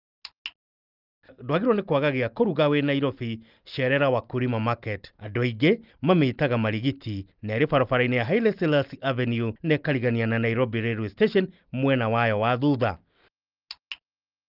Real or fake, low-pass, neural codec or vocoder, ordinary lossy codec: real; 5.4 kHz; none; Opus, 32 kbps